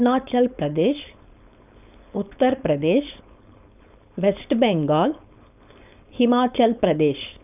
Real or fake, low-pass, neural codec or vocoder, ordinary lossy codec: fake; 3.6 kHz; codec, 16 kHz, 4.8 kbps, FACodec; none